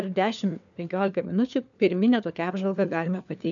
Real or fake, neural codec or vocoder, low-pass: fake; codec, 16 kHz, 4 kbps, FunCodec, trained on LibriTTS, 50 frames a second; 7.2 kHz